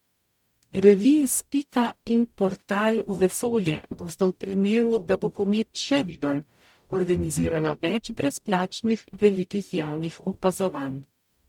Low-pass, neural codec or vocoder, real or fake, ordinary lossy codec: 19.8 kHz; codec, 44.1 kHz, 0.9 kbps, DAC; fake; MP3, 96 kbps